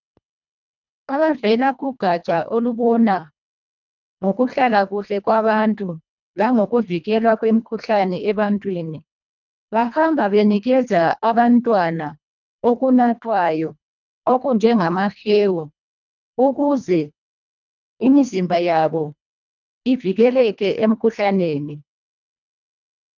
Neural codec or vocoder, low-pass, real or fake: codec, 24 kHz, 1.5 kbps, HILCodec; 7.2 kHz; fake